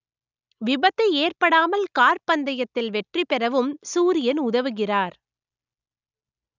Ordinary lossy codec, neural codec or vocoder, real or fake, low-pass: none; none; real; 7.2 kHz